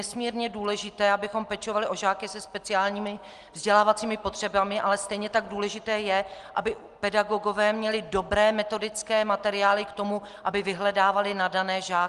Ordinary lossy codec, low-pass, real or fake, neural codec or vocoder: Opus, 24 kbps; 10.8 kHz; real; none